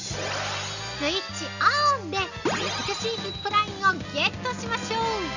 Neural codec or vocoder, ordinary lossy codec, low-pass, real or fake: none; none; 7.2 kHz; real